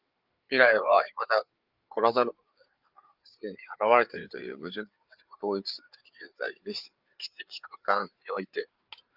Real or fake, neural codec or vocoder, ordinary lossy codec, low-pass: fake; codec, 16 kHz, 4 kbps, FreqCodec, larger model; Opus, 32 kbps; 5.4 kHz